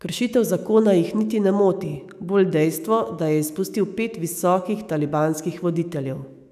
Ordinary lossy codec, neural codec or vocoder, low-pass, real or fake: none; autoencoder, 48 kHz, 128 numbers a frame, DAC-VAE, trained on Japanese speech; 14.4 kHz; fake